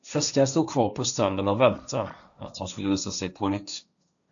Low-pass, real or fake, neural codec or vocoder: 7.2 kHz; fake; codec, 16 kHz, 1.1 kbps, Voila-Tokenizer